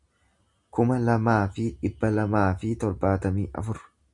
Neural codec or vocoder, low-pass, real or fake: none; 10.8 kHz; real